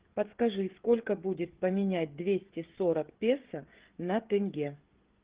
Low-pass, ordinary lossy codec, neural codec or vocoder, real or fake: 3.6 kHz; Opus, 16 kbps; codec, 16 kHz, 16 kbps, FreqCodec, smaller model; fake